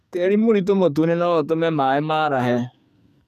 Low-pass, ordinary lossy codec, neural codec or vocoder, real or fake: 14.4 kHz; none; codec, 44.1 kHz, 2.6 kbps, SNAC; fake